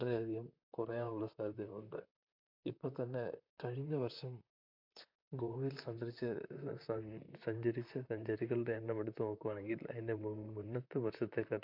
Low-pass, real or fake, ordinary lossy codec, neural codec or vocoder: 5.4 kHz; fake; MP3, 48 kbps; vocoder, 44.1 kHz, 128 mel bands, Pupu-Vocoder